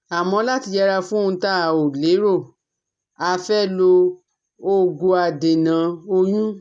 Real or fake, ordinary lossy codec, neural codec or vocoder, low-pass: real; none; none; 9.9 kHz